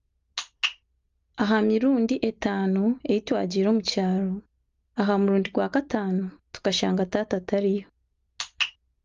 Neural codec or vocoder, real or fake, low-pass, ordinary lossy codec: none; real; 7.2 kHz; Opus, 32 kbps